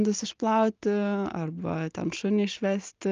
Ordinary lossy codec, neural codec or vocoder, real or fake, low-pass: Opus, 32 kbps; none; real; 7.2 kHz